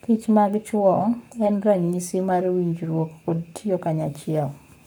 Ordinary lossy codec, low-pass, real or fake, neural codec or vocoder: none; none; fake; codec, 44.1 kHz, 7.8 kbps, Pupu-Codec